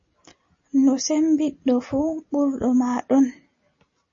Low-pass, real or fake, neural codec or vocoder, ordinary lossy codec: 7.2 kHz; real; none; MP3, 32 kbps